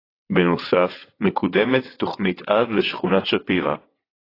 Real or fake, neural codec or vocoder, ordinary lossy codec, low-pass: fake; vocoder, 22.05 kHz, 80 mel bands, WaveNeXt; AAC, 24 kbps; 5.4 kHz